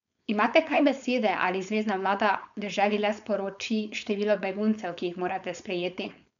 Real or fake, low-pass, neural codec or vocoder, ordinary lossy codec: fake; 7.2 kHz; codec, 16 kHz, 4.8 kbps, FACodec; none